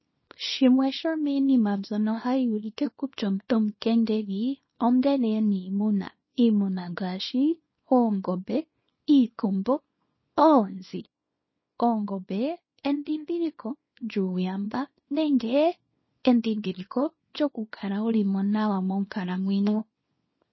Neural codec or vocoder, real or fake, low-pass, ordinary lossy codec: codec, 24 kHz, 0.9 kbps, WavTokenizer, small release; fake; 7.2 kHz; MP3, 24 kbps